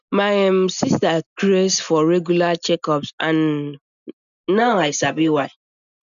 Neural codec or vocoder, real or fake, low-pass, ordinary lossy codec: none; real; 7.2 kHz; none